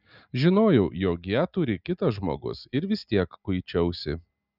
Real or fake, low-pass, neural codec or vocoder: real; 5.4 kHz; none